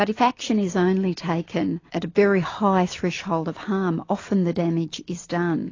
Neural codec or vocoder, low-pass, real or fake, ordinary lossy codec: none; 7.2 kHz; real; AAC, 32 kbps